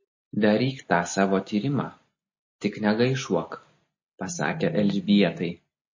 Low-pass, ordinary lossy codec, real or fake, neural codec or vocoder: 7.2 kHz; MP3, 32 kbps; real; none